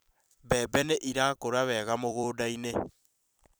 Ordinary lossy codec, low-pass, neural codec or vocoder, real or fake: none; none; vocoder, 44.1 kHz, 128 mel bands every 256 samples, BigVGAN v2; fake